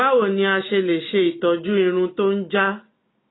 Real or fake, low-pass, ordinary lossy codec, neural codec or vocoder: real; 7.2 kHz; AAC, 16 kbps; none